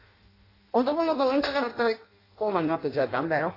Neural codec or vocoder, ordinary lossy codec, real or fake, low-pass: codec, 16 kHz in and 24 kHz out, 0.6 kbps, FireRedTTS-2 codec; AAC, 24 kbps; fake; 5.4 kHz